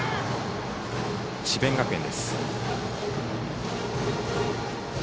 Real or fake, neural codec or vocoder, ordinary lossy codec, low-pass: real; none; none; none